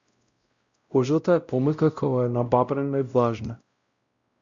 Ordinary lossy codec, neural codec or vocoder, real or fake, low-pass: Opus, 64 kbps; codec, 16 kHz, 0.5 kbps, X-Codec, WavLM features, trained on Multilingual LibriSpeech; fake; 7.2 kHz